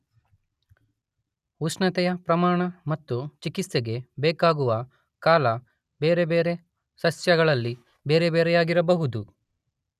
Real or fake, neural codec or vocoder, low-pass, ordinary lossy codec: real; none; 14.4 kHz; none